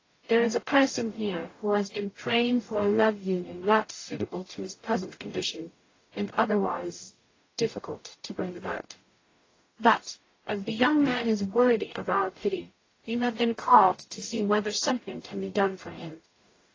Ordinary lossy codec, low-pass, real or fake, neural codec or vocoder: AAC, 32 kbps; 7.2 kHz; fake; codec, 44.1 kHz, 0.9 kbps, DAC